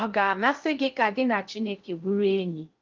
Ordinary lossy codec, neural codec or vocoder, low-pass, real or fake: Opus, 32 kbps; codec, 16 kHz in and 24 kHz out, 0.6 kbps, FocalCodec, streaming, 2048 codes; 7.2 kHz; fake